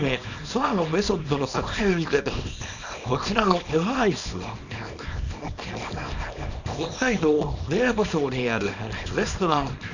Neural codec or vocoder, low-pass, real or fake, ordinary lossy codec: codec, 24 kHz, 0.9 kbps, WavTokenizer, small release; 7.2 kHz; fake; none